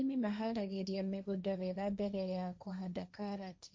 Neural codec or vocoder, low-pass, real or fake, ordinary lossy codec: codec, 16 kHz, 1.1 kbps, Voila-Tokenizer; none; fake; none